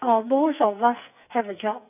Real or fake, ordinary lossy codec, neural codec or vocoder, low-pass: fake; none; codec, 44.1 kHz, 2.6 kbps, SNAC; 3.6 kHz